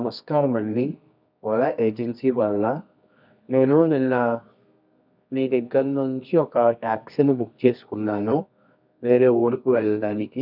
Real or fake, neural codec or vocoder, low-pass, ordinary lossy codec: fake; codec, 24 kHz, 0.9 kbps, WavTokenizer, medium music audio release; 5.4 kHz; none